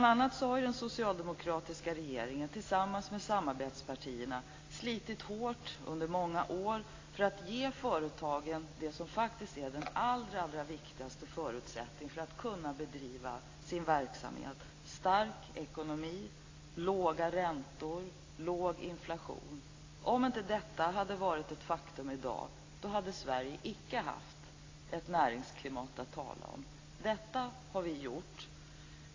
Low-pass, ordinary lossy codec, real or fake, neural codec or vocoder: 7.2 kHz; AAC, 32 kbps; real; none